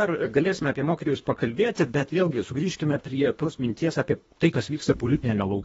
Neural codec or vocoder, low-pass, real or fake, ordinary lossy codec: codec, 24 kHz, 1.5 kbps, HILCodec; 10.8 kHz; fake; AAC, 24 kbps